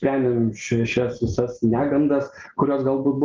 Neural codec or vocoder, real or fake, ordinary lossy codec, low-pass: none; real; Opus, 32 kbps; 7.2 kHz